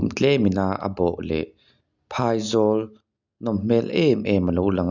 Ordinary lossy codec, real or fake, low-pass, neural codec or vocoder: none; real; 7.2 kHz; none